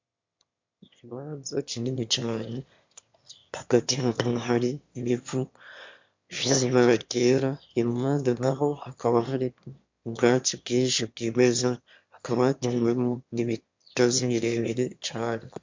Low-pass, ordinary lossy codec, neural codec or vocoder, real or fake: 7.2 kHz; MP3, 64 kbps; autoencoder, 22.05 kHz, a latent of 192 numbers a frame, VITS, trained on one speaker; fake